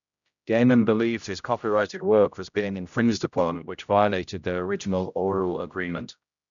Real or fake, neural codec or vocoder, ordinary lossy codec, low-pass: fake; codec, 16 kHz, 0.5 kbps, X-Codec, HuBERT features, trained on general audio; none; 7.2 kHz